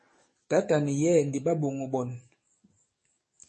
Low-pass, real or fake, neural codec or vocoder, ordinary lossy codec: 10.8 kHz; fake; codec, 44.1 kHz, 7.8 kbps, DAC; MP3, 32 kbps